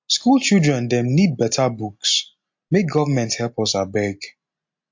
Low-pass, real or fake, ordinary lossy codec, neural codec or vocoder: 7.2 kHz; real; MP3, 48 kbps; none